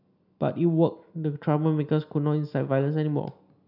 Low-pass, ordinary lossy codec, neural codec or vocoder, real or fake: 5.4 kHz; none; none; real